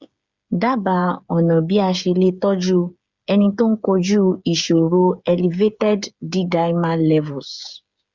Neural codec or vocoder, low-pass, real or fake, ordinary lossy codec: codec, 16 kHz, 8 kbps, FreqCodec, smaller model; 7.2 kHz; fake; Opus, 64 kbps